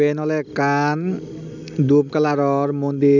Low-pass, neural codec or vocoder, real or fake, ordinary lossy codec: 7.2 kHz; none; real; none